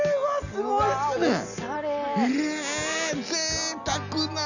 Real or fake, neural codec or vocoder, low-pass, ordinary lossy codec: real; none; 7.2 kHz; none